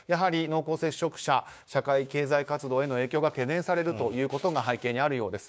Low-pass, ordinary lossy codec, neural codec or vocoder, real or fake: none; none; codec, 16 kHz, 6 kbps, DAC; fake